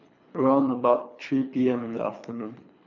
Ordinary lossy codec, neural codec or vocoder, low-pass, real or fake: none; codec, 24 kHz, 3 kbps, HILCodec; 7.2 kHz; fake